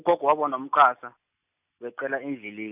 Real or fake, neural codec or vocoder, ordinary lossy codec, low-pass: real; none; none; 3.6 kHz